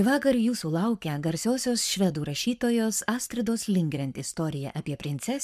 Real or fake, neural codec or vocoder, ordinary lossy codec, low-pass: fake; codec, 44.1 kHz, 7.8 kbps, Pupu-Codec; MP3, 96 kbps; 14.4 kHz